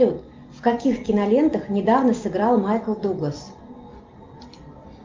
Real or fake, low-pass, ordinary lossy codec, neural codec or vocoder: real; 7.2 kHz; Opus, 32 kbps; none